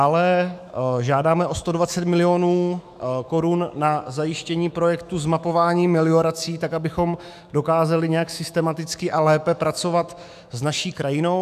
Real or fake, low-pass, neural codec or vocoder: fake; 14.4 kHz; autoencoder, 48 kHz, 128 numbers a frame, DAC-VAE, trained on Japanese speech